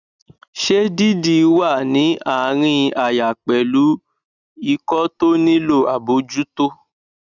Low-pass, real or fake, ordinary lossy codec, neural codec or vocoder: 7.2 kHz; real; none; none